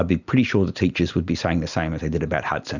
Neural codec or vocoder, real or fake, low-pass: none; real; 7.2 kHz